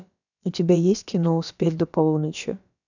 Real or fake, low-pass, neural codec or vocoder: fake; 7.2 kHz; codec, 16 kHz, about 1 kbps, DyCAST, with the encoder's durations